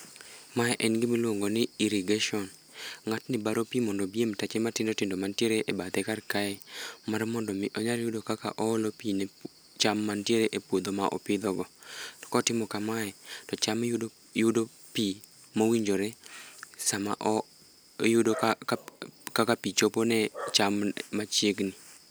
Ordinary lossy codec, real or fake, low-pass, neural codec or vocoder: none; real; none; none